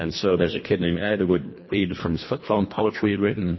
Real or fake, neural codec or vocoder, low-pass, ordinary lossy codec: fake; codec, 24 kHz, 1.5 kbps, HILCodec; 7.2 kHz; MP3, 24 kbps